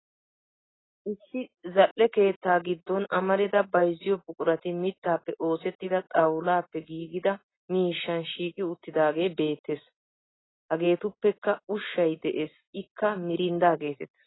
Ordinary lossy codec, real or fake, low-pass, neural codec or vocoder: AAC, 16 kbps; real; 7.2 kHz; none